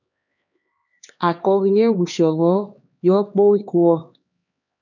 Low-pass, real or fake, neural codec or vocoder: 7.2 kHz; fake; codec, 16 kHz, 2 kbps, X-Codec, HuBERT features, trained on LibriSpeech